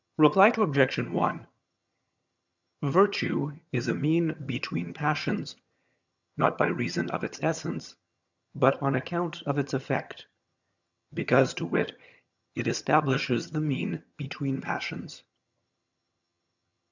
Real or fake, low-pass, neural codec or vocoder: fake; 7.2 kHz; vocoder, 22.05 kHz, 80 mel bands, HiFi-GAN